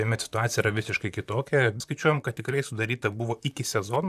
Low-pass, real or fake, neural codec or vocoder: 14.4 kHz; fake; vocoder, 44.1 kHz, 128 mel bands, Pupu-Vocoder